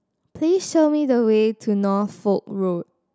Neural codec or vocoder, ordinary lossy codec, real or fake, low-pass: none; none; real; none